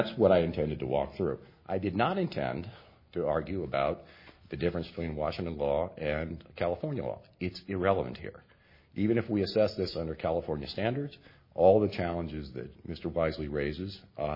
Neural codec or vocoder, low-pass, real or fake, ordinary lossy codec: none; 5.4 kHz; real; MP3, 24 kbps